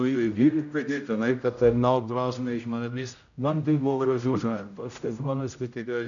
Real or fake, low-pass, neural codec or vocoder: fake; 7.2 kHz; codec, 16 kHz, 0.5 kbps, X-Codec, HuBERT features, trained on general audio